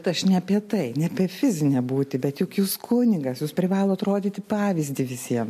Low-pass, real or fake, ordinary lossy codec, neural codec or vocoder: 14.4 kHz; real; MP3, 64 kbps; none